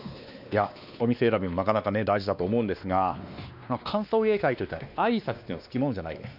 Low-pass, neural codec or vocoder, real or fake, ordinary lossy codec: 5.4 kHz; codec, 16 kHz, 2 kbps, X-Codec, WavLM features, trained on Multilingual LibriSpeech; fake; none